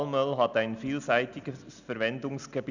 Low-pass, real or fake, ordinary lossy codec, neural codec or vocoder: 7.2 kHz; real; none; none